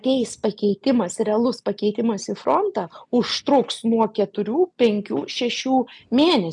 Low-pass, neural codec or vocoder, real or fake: 10.8 kHz; none; real